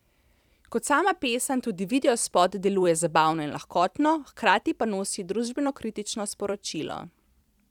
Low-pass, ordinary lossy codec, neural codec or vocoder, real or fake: 19.8 kHz; none; vocoder, 44.1 kHz, 128 mel bands every 256 samples, BigVGAN v2; fake